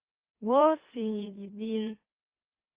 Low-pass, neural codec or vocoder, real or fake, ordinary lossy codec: 3.6 kHz; autoencoder, 44.1 kHz, a latent of 192 numbers a frame, MeloTTS; fake; Opus, 32 kbps